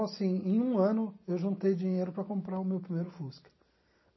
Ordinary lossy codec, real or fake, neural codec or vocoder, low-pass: MP3, 24 kbps; real; none; 7.2 kHz